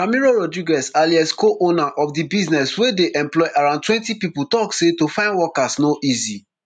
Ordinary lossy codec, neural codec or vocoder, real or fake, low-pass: none; none; real; 9.9 kHz